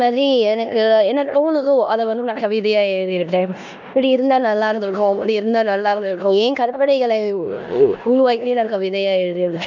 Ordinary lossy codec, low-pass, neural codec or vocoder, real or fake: none; 7.2 kHz; codec, 16 kHz in and 24 kHz out, 0.9 kbps, LongCat-Audio-Codec, four codebook decoder; fake